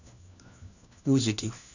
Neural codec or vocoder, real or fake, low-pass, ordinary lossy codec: codec, 16 kHz, 1 kbps, FunCodec, trained on LibriTTS, 50 frames a second; fake; 7.2 kHz; none